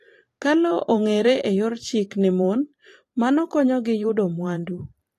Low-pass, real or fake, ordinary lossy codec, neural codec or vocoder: 14.4 kHz; fake; AAC, 48 kbps; vocoder, 44.1 kHz, 128 mel bands every 512 samples, BigVGAN v2